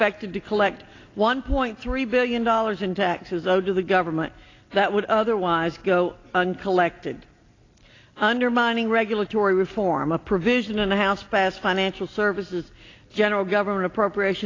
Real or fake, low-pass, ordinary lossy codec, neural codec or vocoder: real; 7.2 kHz; AAC, 32 kbps; none